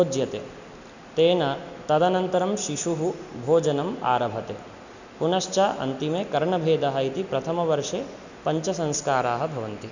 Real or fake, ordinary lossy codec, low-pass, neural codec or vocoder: real; none; 7.2 kHz; none